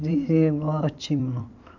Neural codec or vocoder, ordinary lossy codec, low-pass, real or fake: vocoder, 44.1 kHz, 128 mel bands every 256 samples, BigVGAN v2; none; 7.2 kHz; fake